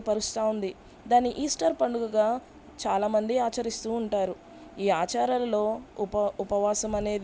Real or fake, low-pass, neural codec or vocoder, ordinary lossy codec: real; none; none; none